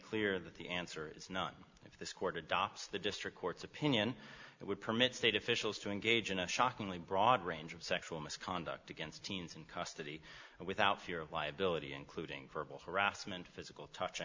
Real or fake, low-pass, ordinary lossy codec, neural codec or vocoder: real; 7.2 kHz; MP3, 64 kbps; none